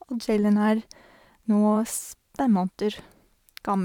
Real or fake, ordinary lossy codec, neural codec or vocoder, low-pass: fake; none; vocoder, 44.1 kHz, 128 mel bands every 512 samples, BigVGAN v2; 19.8 kHz